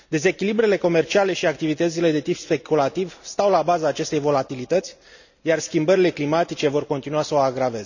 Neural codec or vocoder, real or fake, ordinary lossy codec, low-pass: none; real; none; 7.2 kHz